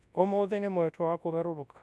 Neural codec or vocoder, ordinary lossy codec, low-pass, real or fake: codec, 24 kHz, 0.9 kbps, WavTokenizer, large speech release; none; none; fake